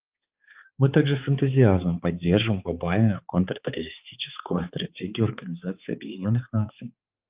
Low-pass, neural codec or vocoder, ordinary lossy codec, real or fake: 3.6 kHz; codec, 16 kHz, 4 kbps, X-Codec, HuBERT features, trained on balanced general audio; Opus, 24 kbps; fake